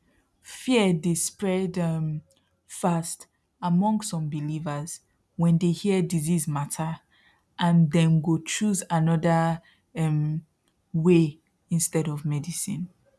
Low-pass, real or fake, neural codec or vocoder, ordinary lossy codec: none; real; none; none